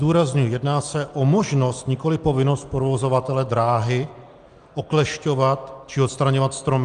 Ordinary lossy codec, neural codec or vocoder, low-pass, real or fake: Opus, 32 kbps; none; 9.9 kHz; real